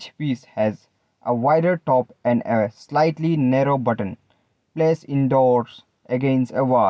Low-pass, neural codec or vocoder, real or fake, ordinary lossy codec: none; none; real; none